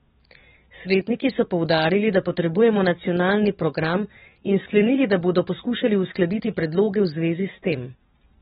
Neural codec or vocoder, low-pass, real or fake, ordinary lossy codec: codec, 44.1 kHz, 7.8 kbps, DAC; 19.8 kHz; fake; AAC, 16 kbps